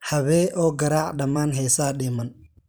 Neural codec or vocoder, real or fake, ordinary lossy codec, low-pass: vocoder, 44.1 kHz, 128 mel bands every 512 samples, BigVGAN v2; fake; none; none